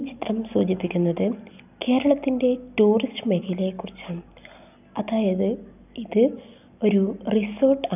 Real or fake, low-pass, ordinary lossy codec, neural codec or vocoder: real; 3.6 kHz; none; none